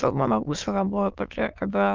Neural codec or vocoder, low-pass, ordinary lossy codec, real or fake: autoencoder, 22.05 kHz, a latent of 192 numbers a frame, VITS, trained on many speakers; 7.2 kHz; Opus, 32 kbps; fake